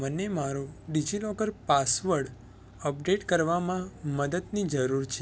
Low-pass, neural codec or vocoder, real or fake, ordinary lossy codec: none; none; real; none